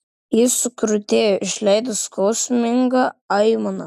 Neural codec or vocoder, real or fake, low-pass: none; real; 14.4 kHz